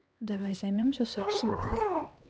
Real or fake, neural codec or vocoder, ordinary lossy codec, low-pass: fake; codec, 16 kHz, 2 kbps, X-Codec, HuBERT features, trained on LibriSpeech; none; none